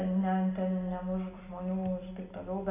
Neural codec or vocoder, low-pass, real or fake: none; 3.6 kHz; real